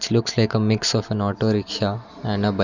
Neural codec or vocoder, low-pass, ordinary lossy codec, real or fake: none; 7.2 kHz; none; real